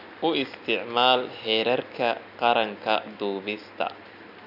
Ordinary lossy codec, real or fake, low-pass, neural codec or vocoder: none; real; 5.4 kHz; none